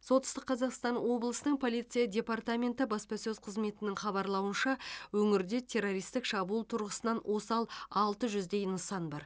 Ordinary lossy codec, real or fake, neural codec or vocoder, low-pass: none; real; none; none